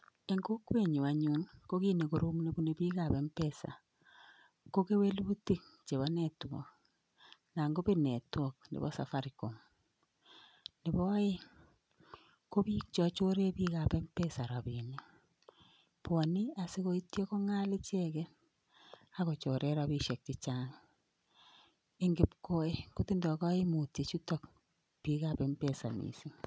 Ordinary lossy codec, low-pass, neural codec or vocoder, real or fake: none; none; none; real